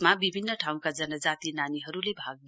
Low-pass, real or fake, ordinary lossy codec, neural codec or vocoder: none; real; none; none